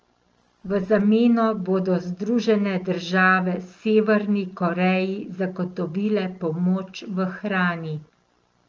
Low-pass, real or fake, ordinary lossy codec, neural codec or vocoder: 7.2 kHz; real; Opus, 24 kbps; none